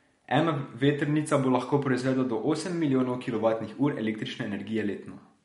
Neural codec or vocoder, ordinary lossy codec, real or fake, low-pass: none; MP3, 48 kbps; real; 10.8 kHz